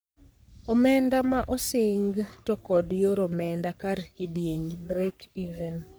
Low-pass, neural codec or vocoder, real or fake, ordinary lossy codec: none; codec, 44.1 kHz, 3.4 kbps, Pupu-Codec; fake; none